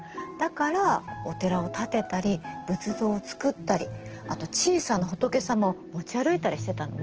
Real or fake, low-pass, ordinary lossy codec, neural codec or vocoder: real; 7.2 kHz; Opus, 16 kbps; none